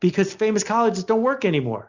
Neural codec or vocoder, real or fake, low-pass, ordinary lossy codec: none; real; 7.2 kHz; Opus, 64 kbps